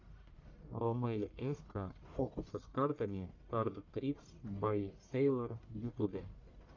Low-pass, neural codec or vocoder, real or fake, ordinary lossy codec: 7.2 kHz; codec, 44.1 kHz, 1.7 kbps, Pupu-Codec; fake; AAC, 48 kbps